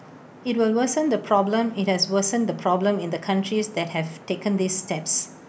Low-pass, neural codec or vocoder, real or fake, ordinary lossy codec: none; none; real; none